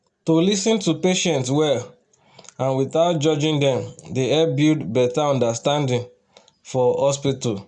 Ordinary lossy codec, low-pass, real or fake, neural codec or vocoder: none; 9.9 kHz; real; none